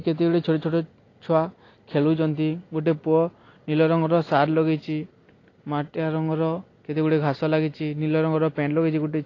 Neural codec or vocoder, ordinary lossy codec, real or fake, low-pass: none; AAC, 32 kbps; real; 7.2 kHz